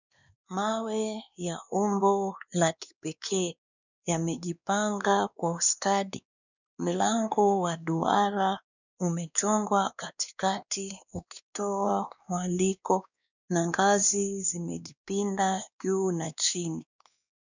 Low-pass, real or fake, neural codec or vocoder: 7.2 kHz; fake; codec, 16 kHz, 2 kbps, X-Codec, WavLM features, trained on Multilingual LibriSpeech